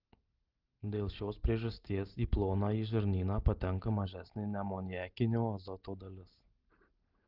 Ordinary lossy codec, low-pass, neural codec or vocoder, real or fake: Opus, 16 kbps; 5.4 kHz; none; real